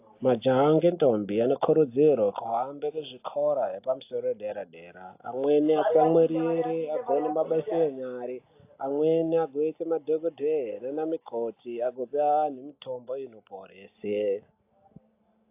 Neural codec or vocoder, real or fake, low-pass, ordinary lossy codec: none; real; 3.6 kHz; AAC, 24 kbps